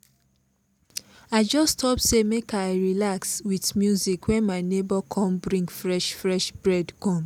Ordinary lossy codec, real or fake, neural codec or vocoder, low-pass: none; real; none; 19.8 kHz